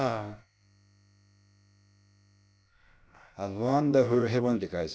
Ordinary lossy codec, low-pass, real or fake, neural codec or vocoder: none; none; fake; codec, 16 kHz, about 1 kbps, DyCAST, with the encoder's durations